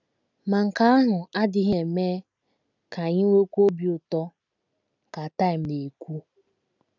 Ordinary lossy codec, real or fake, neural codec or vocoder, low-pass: none; real; none; 7.2 kHz